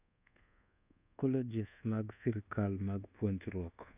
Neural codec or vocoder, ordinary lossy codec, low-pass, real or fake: codec, 24 kHz, 1.2 kbps, DualCodec; none; 3.6 kHz; fake